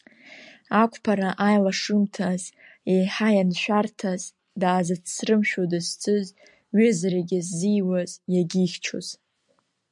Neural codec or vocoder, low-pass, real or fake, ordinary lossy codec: none; 10.8 kHz; real; MP3, 96 kbps